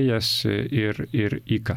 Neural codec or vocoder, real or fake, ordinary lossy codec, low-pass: none; real; MP3, 96 kbps; 19.8 kHz